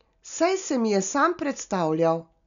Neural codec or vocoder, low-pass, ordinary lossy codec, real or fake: none; 7.2 kHz; none; real